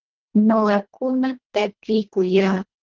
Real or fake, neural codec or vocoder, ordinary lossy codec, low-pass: fake; codec, 24 kHz, 1.5 kbps, HILCodec; Opus, 16 kbps; 7.2 kHz